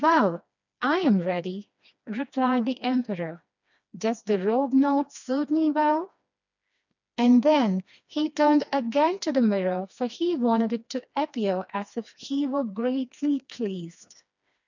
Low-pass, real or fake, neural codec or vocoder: 7.2 kHz; fake; codec, 16 kHz, 2 kbps, FreqCodec, smaller model